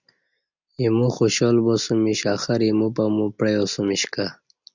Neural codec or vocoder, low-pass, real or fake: none; 7.2 kHz; real